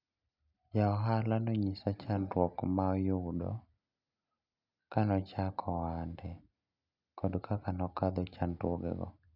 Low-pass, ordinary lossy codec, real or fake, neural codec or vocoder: 5.4 kHz; none; real; none